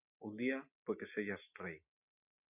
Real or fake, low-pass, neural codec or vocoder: real; 3.6 kHz; none